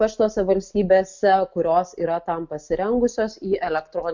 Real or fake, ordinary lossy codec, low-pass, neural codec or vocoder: real; MP3, 64 kbps; 7.2 kHz; none